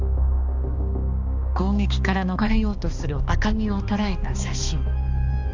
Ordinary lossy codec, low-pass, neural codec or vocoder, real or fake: AAC, 48 kbps; 7.2 kHz; codec, 16 kHz, 2 kbps, X-Codec, HuBERT features, trained on balanced general audio; fake